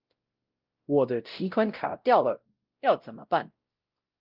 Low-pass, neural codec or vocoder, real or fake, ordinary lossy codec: 5.4 kHz; codec, 16 kHz, 0.5 kbps, X-Codec, WavLM features, trained on Multilingual LibriSpeech; fake; Opus, 24 kbps